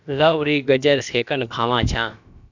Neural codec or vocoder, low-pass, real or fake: codec, 16 kHz, about 1 kbps, DyCAST, with the encoder's durations; 7.2 kHz; fake